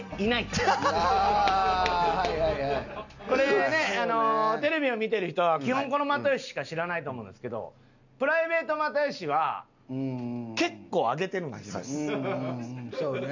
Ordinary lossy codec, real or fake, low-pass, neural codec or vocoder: none; real; 7.2 kHz; none